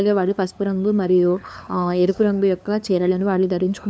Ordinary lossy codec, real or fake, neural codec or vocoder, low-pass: none; fake; codec, 16 kHz, 2 kbps, FunCodec, trained on LibriTTS, 25 frames a second; none